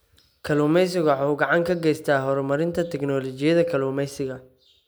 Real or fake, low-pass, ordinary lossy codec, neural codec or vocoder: real; none; none; none